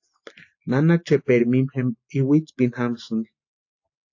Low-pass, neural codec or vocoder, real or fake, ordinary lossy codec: 7.2 kHz; none; real; AAC, 48 kbps